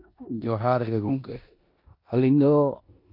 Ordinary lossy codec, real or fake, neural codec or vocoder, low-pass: AAC, 32 kbps; fake; codec, 16 kHz in and 24 kHz out, 0.9 kbps, LongCat-Audio-Codec, four codebook decoder; 5.4 kHz